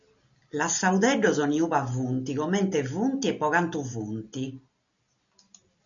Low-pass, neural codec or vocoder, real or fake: 7.2 kHz; none; real